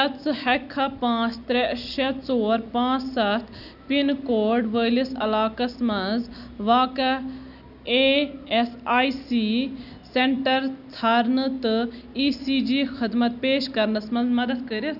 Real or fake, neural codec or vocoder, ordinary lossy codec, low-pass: real; none; none; 5.4 kHz